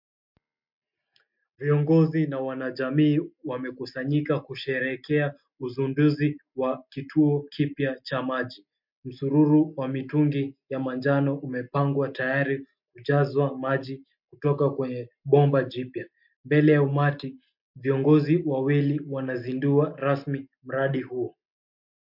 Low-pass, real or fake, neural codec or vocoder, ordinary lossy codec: 5.4 kHz; real; none; MP3, 48 kbps